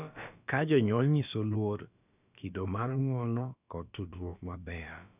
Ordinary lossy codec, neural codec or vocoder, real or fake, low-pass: none; codec, 16 kHz, about 1 kbps, DyCAST, with the encoder's durations; fake; 3.6 kHz